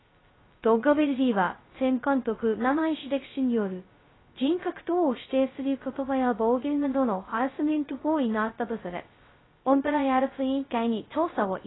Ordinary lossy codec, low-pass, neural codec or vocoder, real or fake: AAC, 16 kbps; 7.2 kHz; codec, 16 kHz, 0.2 kbps, FocalCodec; fake